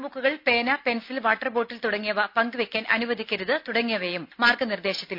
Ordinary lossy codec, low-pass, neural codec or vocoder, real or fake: none; 5.4 kHz; none; real